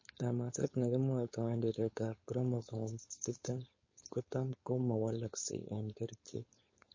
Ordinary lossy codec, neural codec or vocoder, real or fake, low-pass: MP3, 32 kbps; codec, 16 kHz, 4.8 kbps, FACodec; fake; 7.2 kHz